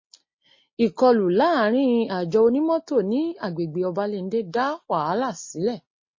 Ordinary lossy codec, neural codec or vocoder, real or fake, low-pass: MP3, 32 kbps; none; real; 7.2 kHz